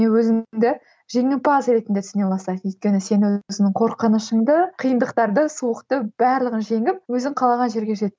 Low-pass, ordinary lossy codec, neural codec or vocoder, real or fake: none; none; none; real